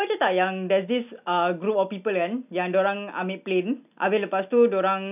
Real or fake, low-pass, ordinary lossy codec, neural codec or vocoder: real; 3.6 kHz; none; none